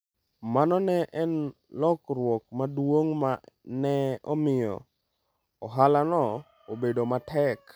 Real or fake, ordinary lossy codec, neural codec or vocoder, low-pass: real; none; none; none